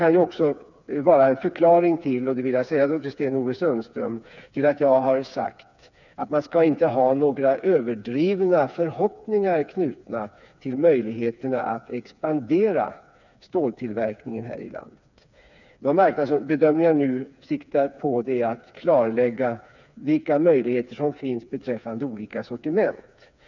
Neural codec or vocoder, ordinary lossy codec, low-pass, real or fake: codec, 16 kHz, 4 kbps, FreqCodec, smaller model; none; 7.2 kHz; fake